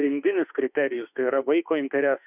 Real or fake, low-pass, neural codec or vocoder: fake; 3.6 kHz; autoencoder, 48 kHz, 32 numbers a frame, DAC-VAE, trained on Japanese speech